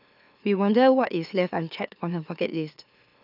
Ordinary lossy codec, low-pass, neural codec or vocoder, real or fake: none; 5.4 kHz; autoencoder, 44.1 kHz, a latent of 192 numbers a frame, MeloTTS; fake